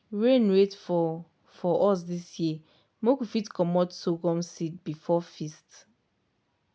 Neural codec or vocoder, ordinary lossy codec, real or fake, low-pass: none; none; real; none